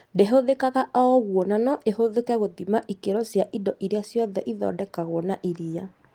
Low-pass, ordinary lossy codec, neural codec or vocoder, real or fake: 19.8 kHz; Opus, 24 kbps; none; real